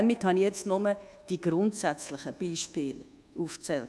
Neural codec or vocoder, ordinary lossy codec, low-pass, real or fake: codec, 24 kHz, 1.2 kbps, DualCodec; none; none; fake